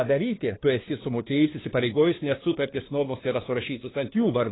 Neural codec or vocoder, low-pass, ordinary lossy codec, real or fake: codec, 16 kHz, 2 kbps, FunCodec, trained on LibriTTS, 25 frames a second; 7.2 kHz; AAC, 16 kbps; fake